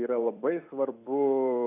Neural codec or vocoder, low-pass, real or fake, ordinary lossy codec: vocoder, 44.1 kHz, 128 mel bands every 256 samples, BigVGAN v2; 3.6 kHz; fake; AAC, 24 kbps